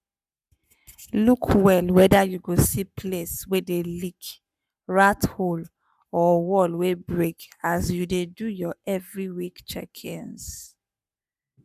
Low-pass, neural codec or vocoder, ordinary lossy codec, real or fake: 14.4 kHz; codec, 44.1 kHz, 7.8 kbps, Pupu-Codec; none; fake